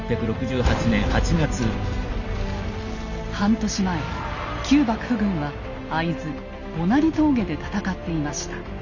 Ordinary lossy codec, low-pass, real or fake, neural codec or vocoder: none; 7.2 kHz; real; none